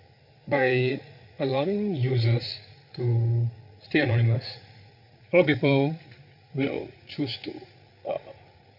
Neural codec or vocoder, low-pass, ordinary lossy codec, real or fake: codec, 16 kHz, 8 kbps, FreqCodec, larger model; 5.4 kHz; none; fake